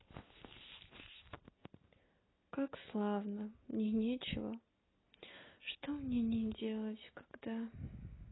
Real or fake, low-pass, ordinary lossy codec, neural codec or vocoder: real; 7.2 kHz; AAC, 16 kbps; none